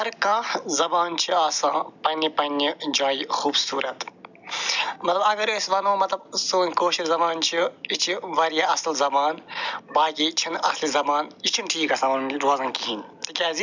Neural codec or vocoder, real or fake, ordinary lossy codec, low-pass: none; real; none; 7.2 kHz